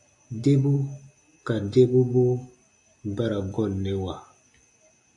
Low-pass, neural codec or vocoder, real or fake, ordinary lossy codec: 10.8 kHz; none; real; AAC, 64 kbps